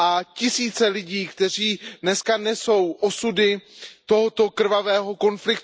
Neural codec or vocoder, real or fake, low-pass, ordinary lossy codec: none; real; none; none